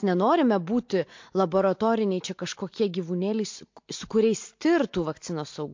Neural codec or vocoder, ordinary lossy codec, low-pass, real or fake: none; MP3, 48 kbps; 7.2 kHz; real